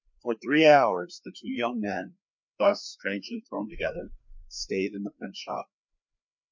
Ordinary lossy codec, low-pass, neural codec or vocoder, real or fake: MP3, 48 kbps; 7.2 kHz; codec, 16 kHz, 2 kbps, FreqCodec, larger model; fake